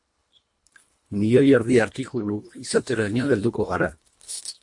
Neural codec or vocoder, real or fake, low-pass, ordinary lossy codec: codec, 24 kHz, 1.5 kbps, HILCodec; fake; 10.8 kHz; MP3, 48 kbps